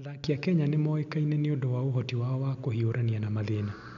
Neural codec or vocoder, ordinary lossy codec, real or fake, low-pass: none; none; real; 7.2 kHz